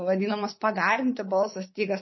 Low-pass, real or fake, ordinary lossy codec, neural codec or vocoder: 7.2 kHz; fake; MP3, 24 kbps; codec, 24 kHz, 3.1 kbps, DualCodec